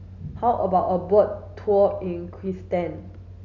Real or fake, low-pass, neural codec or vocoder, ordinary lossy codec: real; 7.2 kHz; none; none